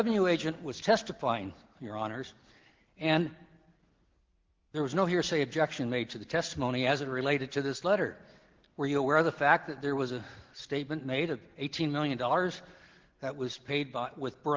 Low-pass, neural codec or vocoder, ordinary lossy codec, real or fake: 7.2 kHz; none; Opus, 16 kbps; real